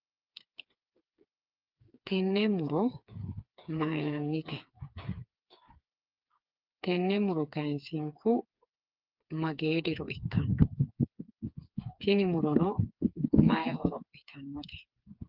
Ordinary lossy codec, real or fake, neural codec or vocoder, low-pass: Opus, 24 kbps; fake; codec, 16 kHz, 4 kbps, FreqCodec, smaller model; 5.4 kHz